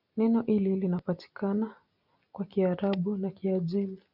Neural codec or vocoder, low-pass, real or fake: none; 5.4 kHz; real